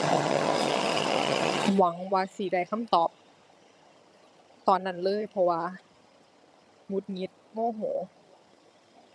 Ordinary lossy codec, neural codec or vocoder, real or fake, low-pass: none; vocoder, 22.05 kHz, 80 mel bands, HiFi-GAN; fake; none